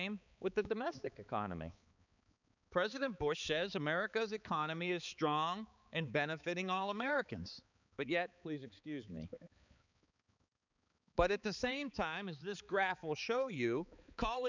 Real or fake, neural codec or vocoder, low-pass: fake; codec, 16 kHz, 4 kbps, X-Codec, HuBERT features, trained on balanced general audio; 7.2 kHz